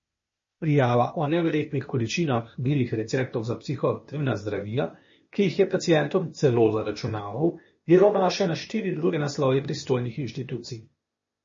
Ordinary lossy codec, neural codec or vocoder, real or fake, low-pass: MP3, 32 kbps; codec, 16 kHz, 0.8 kbps, ZipCodec; fake; 7.2 kHz